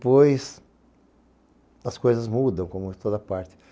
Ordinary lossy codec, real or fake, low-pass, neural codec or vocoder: none; real; none; none